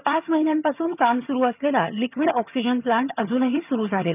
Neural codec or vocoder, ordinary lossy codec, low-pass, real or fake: vocoder, 22.05 kHz, 80 mel bands, HiFi-GAN; none; 3.6 kHz; fake